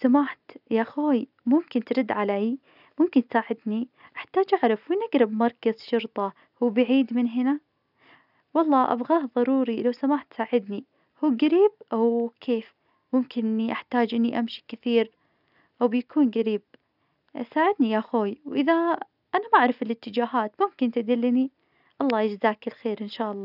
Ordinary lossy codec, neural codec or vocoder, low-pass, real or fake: none; none; 5.4 kHz; real